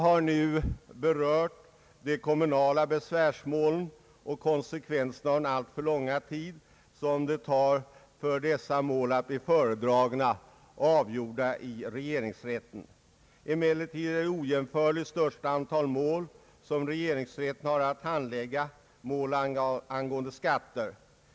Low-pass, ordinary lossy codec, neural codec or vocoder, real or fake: none; none; none; real